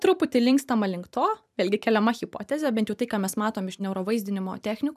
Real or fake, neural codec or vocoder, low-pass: fake; vocoder, 44.1 kHz, 128 mel bands every 512 samples, BigVGAN v2; 14.4 kHz